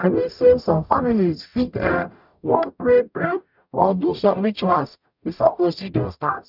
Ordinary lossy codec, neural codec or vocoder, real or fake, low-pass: none; codec, 44.1 kHz, 0.9 kbps, DAC; fake; 5.4 kHz